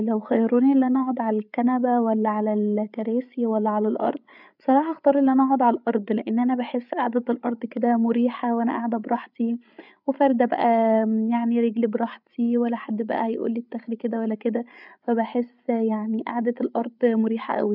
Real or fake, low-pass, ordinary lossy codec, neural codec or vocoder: fake; 5.4 kHz; none; codec, 16 kHz, 8 kbps, FreqCodec, larger model